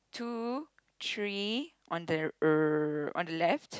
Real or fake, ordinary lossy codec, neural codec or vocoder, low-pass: real; none; none; none